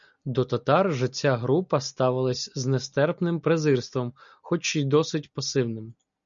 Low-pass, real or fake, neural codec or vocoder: 7.2 kHz; real; none